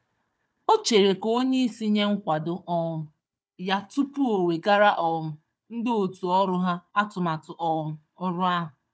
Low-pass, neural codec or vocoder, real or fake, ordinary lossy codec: none; codec, 16 kHz, 4 kbps, FunCodec, trained on Chinese and English, 50 frames a second; fake; none